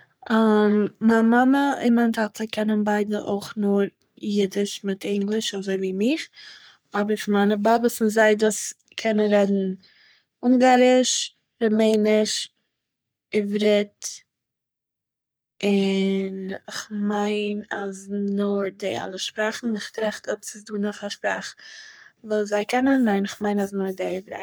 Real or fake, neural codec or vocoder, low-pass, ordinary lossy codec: fake; codec, 44.1 kHz, 3.4 kbps, Pupu-Codec; none; none